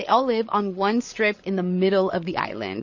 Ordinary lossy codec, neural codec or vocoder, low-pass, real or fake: MP3, 32 kbps; codec, 16 kHz, 8 kbps, FreqCodec, larger model; 7.2 kHz; fake